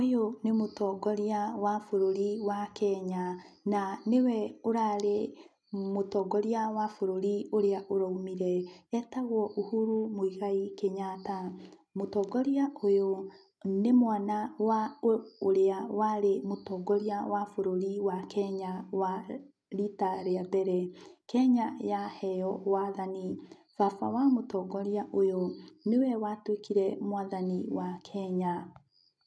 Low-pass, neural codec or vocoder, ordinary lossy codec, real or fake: none; none; none; real